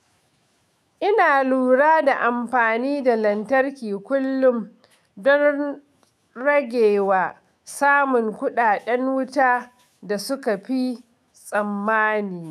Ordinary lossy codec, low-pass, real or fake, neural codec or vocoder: none; 14.4 kHz; fake; autoencoder, 48 kHz, 128 numbers a frame, DAC-VAE, trained on Japanese speech